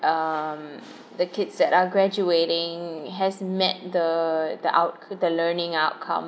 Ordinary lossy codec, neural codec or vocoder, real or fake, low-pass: none; none; real; none